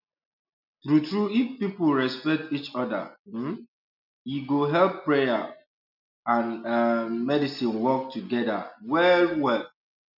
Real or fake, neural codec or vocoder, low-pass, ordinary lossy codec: real; none; 5.4 kHz; none